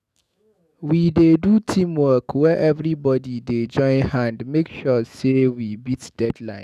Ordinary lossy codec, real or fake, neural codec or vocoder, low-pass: none; fake; autoencoder, 48 kHz, 128 numbers a frame, DAC-VAE, trained on Japanese speech; 14.4 kHz